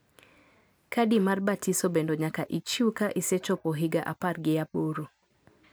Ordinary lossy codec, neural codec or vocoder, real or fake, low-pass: none; none; real; none